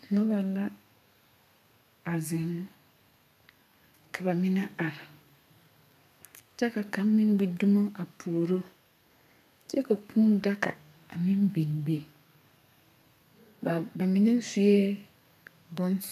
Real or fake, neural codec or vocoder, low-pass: fake; codec, 32 kHz, 1.9 kbps, SNAC; 14.4 kHz